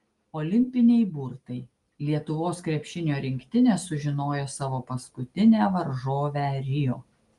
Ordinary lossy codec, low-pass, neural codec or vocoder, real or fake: Opus, 32 kbps; 10.8 kHz; none; real